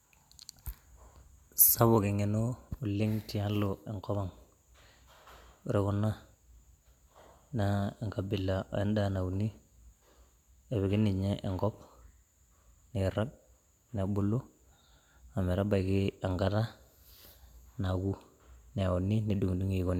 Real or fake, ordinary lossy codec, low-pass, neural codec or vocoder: real; none; 19.8 kHz; none